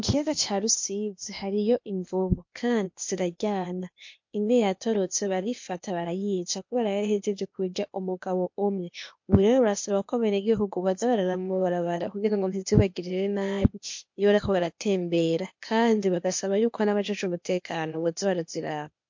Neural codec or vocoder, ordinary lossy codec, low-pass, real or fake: codec, 16 kHz, 0.8 kbps, ZipCodec; MP3, 48 kbps; 7.2 kHz; fake